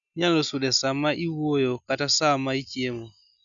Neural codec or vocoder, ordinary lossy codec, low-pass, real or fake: none; none; 7.2 kHz; real